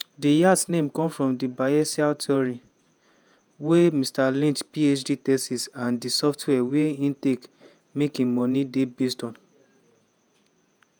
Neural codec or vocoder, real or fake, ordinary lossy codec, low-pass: vocoder, 48 kHz, 128 mel bands, Vocos; fake; none; none